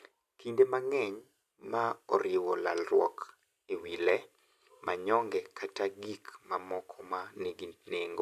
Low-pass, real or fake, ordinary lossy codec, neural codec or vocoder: 14.4 kHz; real; none; none